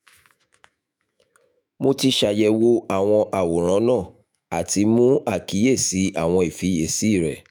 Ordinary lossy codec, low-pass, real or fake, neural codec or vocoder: none; none; fake; autoencoder, 48 kHz, 128 numbers a frame, DAC-VAE, trained on Japanese speech